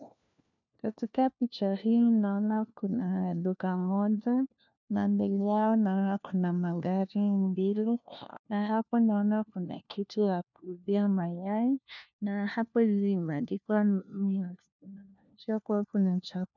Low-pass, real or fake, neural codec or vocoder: 7.2 kHz; fake; codec, 16 kHz, 1 kbps, FunCodec, trained on LibriTTS, 50 frames a second